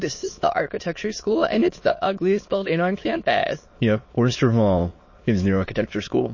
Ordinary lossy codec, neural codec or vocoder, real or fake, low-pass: MP3, 32 kbps; autoencoder, 22.05 kHz, a latent of 192 numbers a frame, VITS, trained on many speakers; fake; 7.2 kHz